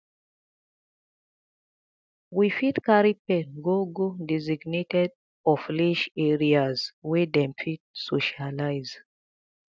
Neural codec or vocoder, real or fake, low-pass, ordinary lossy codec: none; real; none; none